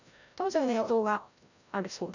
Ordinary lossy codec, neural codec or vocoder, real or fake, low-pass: none; codec, 16 kHz, 0.5 kbps, FreqCodec, larger model; fake; 7.2 kHz